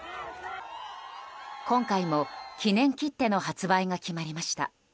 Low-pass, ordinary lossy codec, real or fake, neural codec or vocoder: none; none; real; none